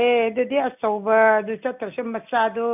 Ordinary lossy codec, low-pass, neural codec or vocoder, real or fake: none; 3.6 kHz; none; real